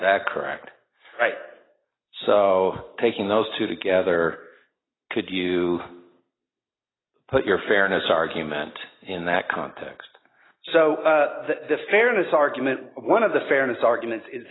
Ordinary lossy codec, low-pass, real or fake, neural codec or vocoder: AAC, 16 kbps; 7.2 kHz; real; none